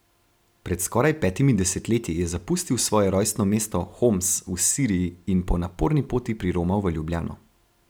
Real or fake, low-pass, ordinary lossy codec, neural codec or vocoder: real; none; none; none